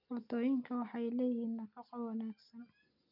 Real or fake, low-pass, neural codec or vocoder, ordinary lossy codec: real; 5.4 kHz; none; none